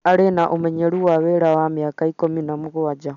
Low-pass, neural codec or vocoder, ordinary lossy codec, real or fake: 7.2 kHz; none; none; real